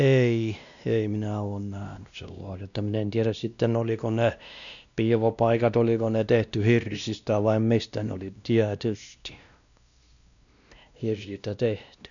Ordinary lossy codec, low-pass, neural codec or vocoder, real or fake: none; 7.2 kHz; codec, 16 kHz, 1 kbps, X-Codec, WavLM features, trained on Multilingual LibriSpeech; fake